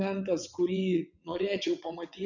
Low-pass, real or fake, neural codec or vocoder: 7.2 kHz; fake; vocoder, 22.05 kHz, 80 mel bands, WaveNeXt